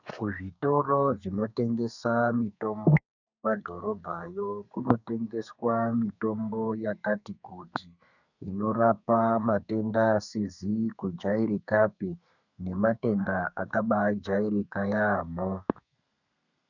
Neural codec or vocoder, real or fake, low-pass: codec, 32 kHz, 1.9 kbps, SNAC; fake; 7.2 kHz